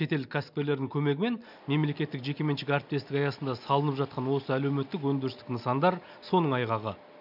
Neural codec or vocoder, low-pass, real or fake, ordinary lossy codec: none; 5.4 kHz; real; none